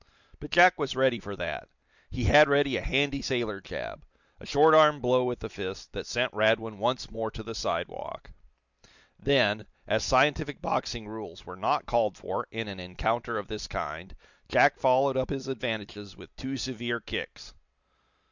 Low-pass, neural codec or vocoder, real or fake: 7.2 kHz; none; real